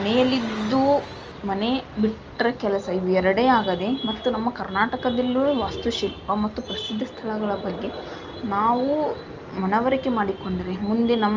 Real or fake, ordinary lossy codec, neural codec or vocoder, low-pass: real; Opus, 24 kbps; none; 7.2 kHz